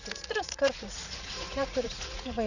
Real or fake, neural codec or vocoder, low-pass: fake; vocoder, 44.1 kHz, 80 mel bands, Vocos; 7.2 kHz